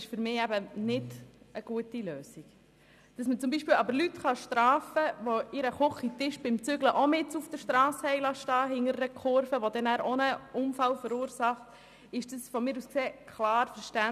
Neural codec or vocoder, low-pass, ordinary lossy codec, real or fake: none; 14.4 kHz; none; real